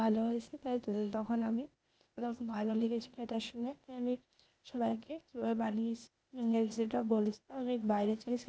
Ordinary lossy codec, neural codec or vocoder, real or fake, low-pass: none; codec, 16 kHz, 0.8 kbps, ZipCodec; fake; none